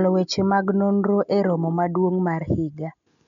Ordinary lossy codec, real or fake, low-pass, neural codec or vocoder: MP3, 64 kbps; real; 7.2 kHz; none